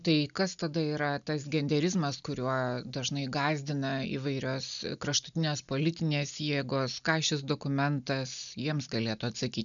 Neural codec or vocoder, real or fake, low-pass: none; real; 7.2 kHz